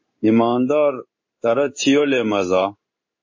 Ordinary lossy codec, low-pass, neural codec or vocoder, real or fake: MP3, 32 kbps; 7.2 kHz; codec, 16 kHz in and 24 kHz out, 1 kbps, XY-Tokenizer; fake